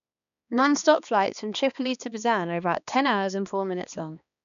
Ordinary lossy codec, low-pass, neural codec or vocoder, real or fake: none; 7.2 kHz; codec, 16 kHz, 2 kbps, X-Codec, HuBERT features, trained on balanced general audio; fake